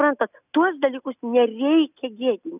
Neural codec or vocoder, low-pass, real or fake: none; 3.6 kHz; real